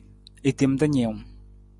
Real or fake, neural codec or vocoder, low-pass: real; none; 10.8 kHz